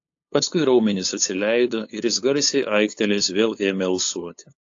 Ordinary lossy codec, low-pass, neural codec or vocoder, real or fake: AAC, 48 kbps; 7.2 kHz; codec, 16 kHz, 8 kbps, FunCodec, trained on LibriTTS, 25 frames a second; fake